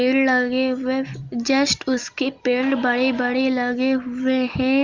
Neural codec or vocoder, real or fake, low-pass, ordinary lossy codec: none; real; 7.2 kHz; Opus, 32 kbps